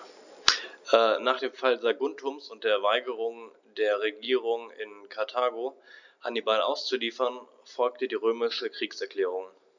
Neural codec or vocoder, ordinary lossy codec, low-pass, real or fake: none; none; 7.2 kHz; real